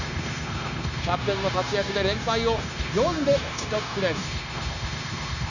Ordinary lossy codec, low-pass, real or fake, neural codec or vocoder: none; 7.2 kHz; fake; codec, 16 kHz, 0.9 kbps, LongCat-Audio-Codec